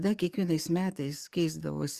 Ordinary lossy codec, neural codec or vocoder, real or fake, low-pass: Opus, 64 kbps; codec, 44.1 kHz, 7.8 kbps, DAC; fake; 14.4 kHz